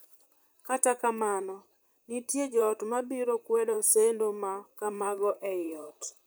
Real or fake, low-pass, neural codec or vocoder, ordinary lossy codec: fake; none; vocoder, 44.1 kHz, 128 mel bands, Pupu-Vocoder; none